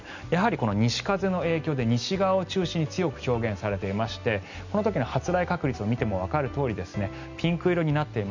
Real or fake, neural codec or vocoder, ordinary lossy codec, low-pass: real; none; none; 7.2 kHz